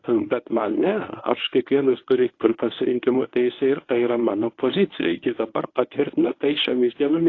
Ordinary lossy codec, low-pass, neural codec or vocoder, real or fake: AAC, 32 kbps; 7.2 kHz; codec, 24 kHz, 0.9 kbps, WavTokenizer, medium speech release version 1; fake